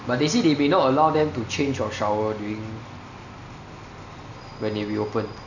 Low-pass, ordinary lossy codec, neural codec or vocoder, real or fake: 7.2 kHz; none; none; real